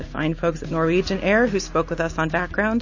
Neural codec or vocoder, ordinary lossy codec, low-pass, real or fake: none; MP3, 32 kbps; 7.2 kHz; real